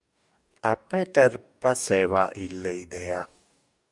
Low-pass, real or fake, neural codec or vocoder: 10.8 kHz; fake; codec, 44.1 kHz, 2.6 kbps, DAC